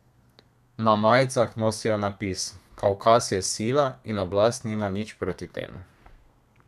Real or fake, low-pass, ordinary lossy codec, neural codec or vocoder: fake; 14.4 kHz; none; codec, 32 kHz, 1.9 kbps, SNAC